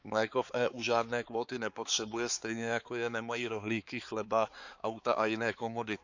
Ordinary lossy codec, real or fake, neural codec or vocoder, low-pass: Opus, 64 kbps; fake; codec, 16 kHz, 4 kbps, X-Codec, HuBERT features, trained on balanced general audio; 7.2 kHz